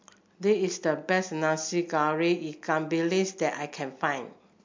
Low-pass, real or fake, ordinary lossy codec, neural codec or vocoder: 7.2 kHz; real; MP3, 48 kbps; none